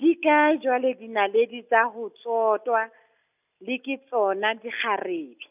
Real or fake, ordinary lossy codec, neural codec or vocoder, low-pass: real; none; none; 3.6 kHz